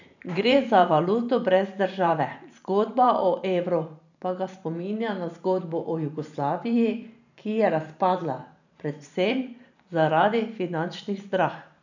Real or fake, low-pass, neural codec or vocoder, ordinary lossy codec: fake; 7.2 kHz; vocoder, 44.1 kHz, 128 mel bands every 512 samples, BigVGAN v2; none